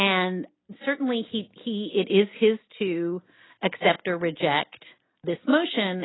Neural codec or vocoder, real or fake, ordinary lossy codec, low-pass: none; real; AAC, 16 kbps; 7.2 kHz